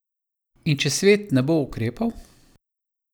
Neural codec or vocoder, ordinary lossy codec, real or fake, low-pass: none; none; real; none